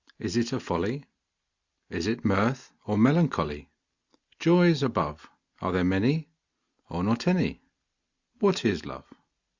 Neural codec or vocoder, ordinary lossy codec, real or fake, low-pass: none; Opus, 64 kbps; real; 7.2 kHz